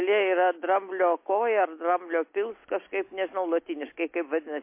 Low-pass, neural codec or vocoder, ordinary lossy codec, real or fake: 3.6 kHz; none; MP3, 24 kbps; real